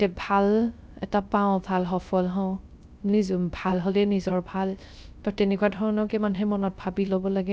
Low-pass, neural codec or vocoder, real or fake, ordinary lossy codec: none; codec, 16 kHz, 0.3 kbps, FocalCodec; fake; none